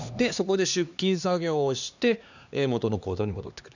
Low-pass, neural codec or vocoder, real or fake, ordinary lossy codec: 7.2 kHz; codec, 16 kHz, 2 kbps, X-Codec, HuBERT features, trained on LibriSpeech; fake; none